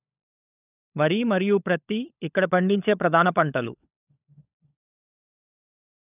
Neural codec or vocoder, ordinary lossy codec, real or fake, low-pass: codec, 16 kHz, 16 kbps, FunCodec, trained on LibriTTS, 50 frames a second; none; fake; 3.6 kHz